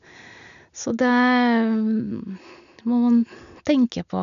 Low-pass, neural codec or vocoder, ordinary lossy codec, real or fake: 7.2 kHz; none; none; real